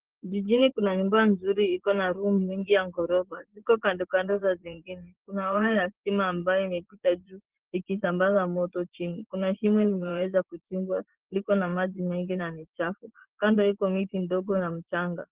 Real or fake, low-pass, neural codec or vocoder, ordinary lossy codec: fake; 3.6 kHz; vocoder, 24 kHz, 100 mel bands, Vocos; Opus, 16 kbps